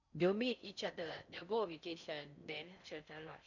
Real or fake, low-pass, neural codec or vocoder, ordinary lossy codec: fake; 7.2 kHz; codec, 16 kHz in and 24 kHz out, 0.6 kbps, FocalCodec, streaming, 4096 codes; none